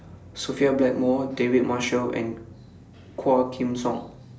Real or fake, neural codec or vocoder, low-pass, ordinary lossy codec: real; none; none; none